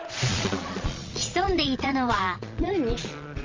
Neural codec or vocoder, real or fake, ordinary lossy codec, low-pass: vocoder, 22.05 kHz, 80 mel bands, Vocos; fake; Opus, 32 kbps; 7.2 kHz